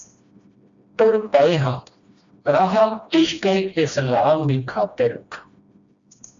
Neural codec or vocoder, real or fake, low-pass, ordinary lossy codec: codec, 16 kHz, 1 kbps, FreqCodec, smaller model; fake; 7.2 kHz; Opus, 64 kbps